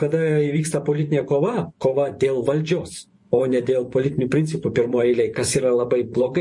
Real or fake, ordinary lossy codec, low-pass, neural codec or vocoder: fake; MP3, 48 kbps; 10.8 kHz; vocoder, 24 kHz, 100 mel bands, Vocos